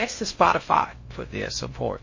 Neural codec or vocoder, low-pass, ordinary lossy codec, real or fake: codec, 16 kHz in and 24 kHz out, 0.6 kbps, FocalCodec, streaming, 4096 codes; 7.2 kHz; MP3, 32 kbps; fake